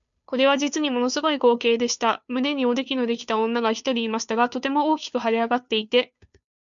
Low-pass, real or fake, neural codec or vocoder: 7.2 kHz; fake; codec, 16 kHz, 2 kbps, FunCodec, trained on Chinese and English, 25 frames a second